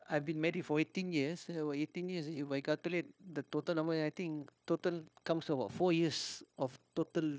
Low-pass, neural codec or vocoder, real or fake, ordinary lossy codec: none; codec, 16 kHz, 0.9 kbps, LongCat-Audio-Codec; fake; none